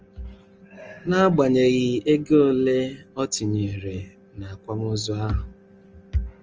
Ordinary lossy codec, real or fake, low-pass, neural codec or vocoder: Opus, 24 kbps; real; 7.2 kHz; none